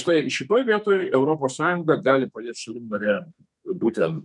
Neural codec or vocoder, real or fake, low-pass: codec, 32 kHz, 1.9 kbps, SNAC; fake; 10.8 kHz